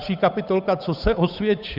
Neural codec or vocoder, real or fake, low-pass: codec, 16 kHz, 8 kbps, FunCodec, trained on Chinese and English, 25 frames a second; fake; 5.4 kHz